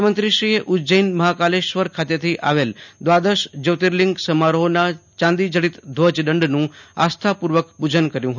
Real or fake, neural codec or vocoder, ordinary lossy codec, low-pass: real; none; none; 7.2 kHz